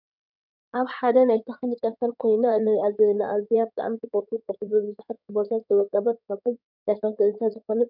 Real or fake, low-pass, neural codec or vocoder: fake; 5.4 kHz; codec, 16 kHz in and 24 kHz out, 2.2 kbps, FireRedTTS-2 codec